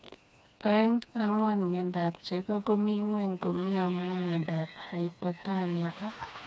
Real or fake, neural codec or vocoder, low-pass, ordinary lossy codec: fake; codec, 16 kHz, 2 kbps, FreqCodec, smaller model; none; none